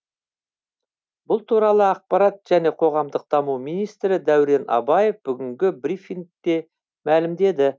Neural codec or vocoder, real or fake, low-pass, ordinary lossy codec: none; real; none; none